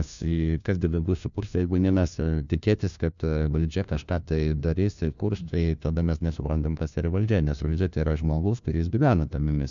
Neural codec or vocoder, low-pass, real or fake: codec, 16 kHz, 1 kbps, FunCodec, trained on LibriTTS, 50 frames a second; 7.2 kHz; fake